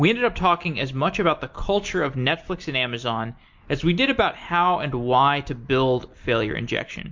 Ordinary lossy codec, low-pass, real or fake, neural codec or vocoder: MP3, 48 kbps; 7.2 kHz; real; none